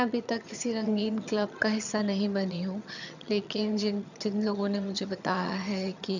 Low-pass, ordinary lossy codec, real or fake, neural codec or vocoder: 7.2 kHz; none; fake; vocoder, 22.05 kHz, 80 mel bands, HiFi-GAN